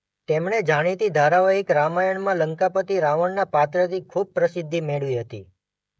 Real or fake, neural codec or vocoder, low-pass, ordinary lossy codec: fake; codec, 16 kHz, 16 kbps, FreqCodec, smaller model; none; none